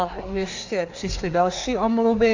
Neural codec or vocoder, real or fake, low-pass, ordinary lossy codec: codec, 24 kHz, 1 kbps, SNAC; fake; 7.2 kHz; AAC, 48 kbps